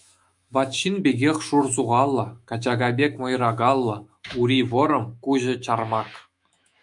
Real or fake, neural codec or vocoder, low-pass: fake; autoencoder, 48 kHz, 128 numbers a frame, DAC-VAE, trained on Japanese speech; 10.8 kHz